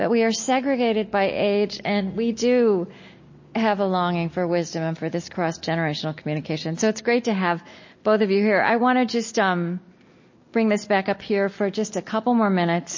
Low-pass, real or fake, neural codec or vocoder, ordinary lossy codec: 7.2 kHz; real; none; MP3, 32 kbps